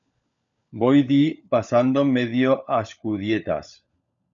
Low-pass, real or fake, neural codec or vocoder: 7.2 kHz; fake; codec, 16 kHz, 16 kbps, FunCodec, trained on LibriTTS, 50 frames a second